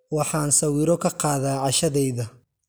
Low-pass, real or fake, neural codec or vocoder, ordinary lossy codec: none; real; none; none